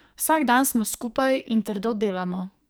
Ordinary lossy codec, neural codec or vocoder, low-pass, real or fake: none; codec, 44.1 kHz, 2.6 kbps, SNAC; none; fake